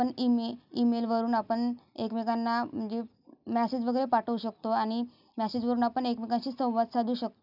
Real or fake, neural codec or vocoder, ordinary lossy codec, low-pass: real; none; none; 5.4 kHz